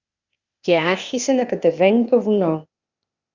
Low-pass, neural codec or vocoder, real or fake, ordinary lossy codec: 7.2 kHz; codec, 16 kHz, 0.8 kbps, ZipCodec; fake; Opus, 64 kbps